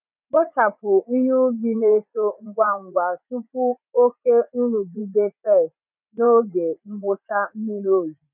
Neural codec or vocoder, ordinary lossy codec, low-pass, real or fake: vocoder, 44.1 kHz, 80 mel bands, Vocos; none; 3.6 kHz; fake